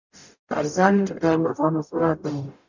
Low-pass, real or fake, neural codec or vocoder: 7.2 kHz; fake; codec, 44.1 kHz, 0.9 kbps, DAC